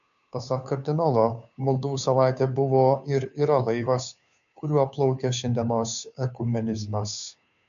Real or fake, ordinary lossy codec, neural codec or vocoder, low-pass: fake; MP3, 96 kbps; codec, 16 kHz, 2 kbps, FunCodec, trained on Chinese and English, 25 frames a second; 7.2 kHz